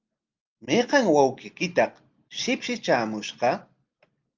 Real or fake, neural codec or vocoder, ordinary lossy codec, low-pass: real; none; Opus, 32 kbps; 7.2 kHz